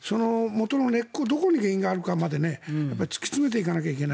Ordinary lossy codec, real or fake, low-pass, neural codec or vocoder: none; real; none; none